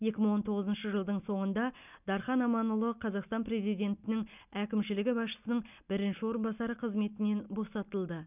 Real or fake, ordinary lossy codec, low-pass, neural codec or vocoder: real; none; 3.6 kHz; none